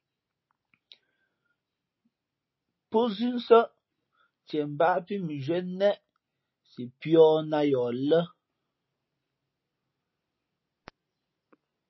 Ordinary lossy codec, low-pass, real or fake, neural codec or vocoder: MP3, 24 kbps; 7.2 kHz; real; none